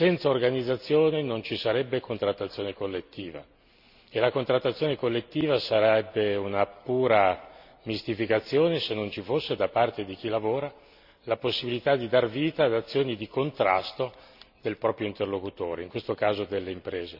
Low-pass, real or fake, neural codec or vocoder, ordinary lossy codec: 5.4 kHz; real; none; none